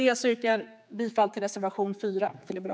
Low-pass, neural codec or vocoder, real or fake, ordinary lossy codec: none; codec, 16 kHz, 4 kbps, X-Codec, HuBERT features, trained on general audio; fake; none